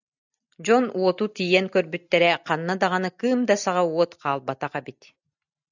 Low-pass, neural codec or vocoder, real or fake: 7.2 kHz; none; real